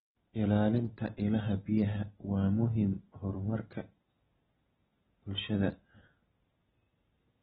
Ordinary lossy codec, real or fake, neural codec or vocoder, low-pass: AAC, 16 kbps; real; none; 19.8 kHz